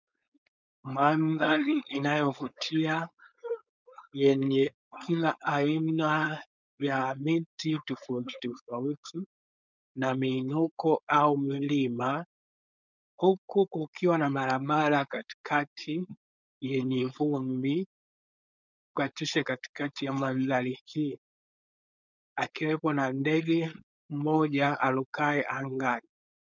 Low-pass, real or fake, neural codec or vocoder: 7.2 kHz; fake; codec, 16 kHz, 4.8 kbps, FACodec